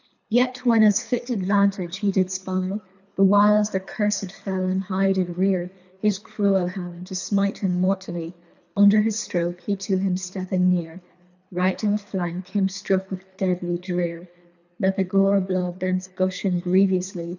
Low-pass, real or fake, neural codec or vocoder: 7.2 kHz; fake; codec, 24 kHz, 3 kbps, HILCodec